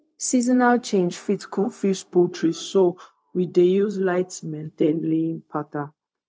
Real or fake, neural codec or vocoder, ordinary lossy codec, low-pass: fake; codec, 16 kHz, 0.4 kbps, LongCat-Audio-Codec; none; none